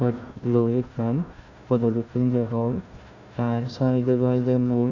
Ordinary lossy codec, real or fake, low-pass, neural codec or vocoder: none; fake; 7.2 kHz; codec, 16 kHz, 1 kbps, FunCodec, trained on Chinese and English, 50 frames a second